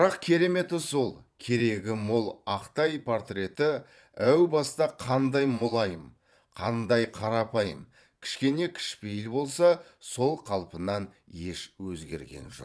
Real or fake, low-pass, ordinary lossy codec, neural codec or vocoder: fake; none; none; vocoder, 22.05 kHz, 80 mel bands, Vocos